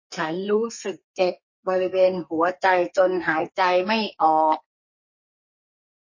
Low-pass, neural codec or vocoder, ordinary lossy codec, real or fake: 7.2 kHz; codec, 32 kHz, 1.9 kbps, SNAC; MP3, 32 kbps; fake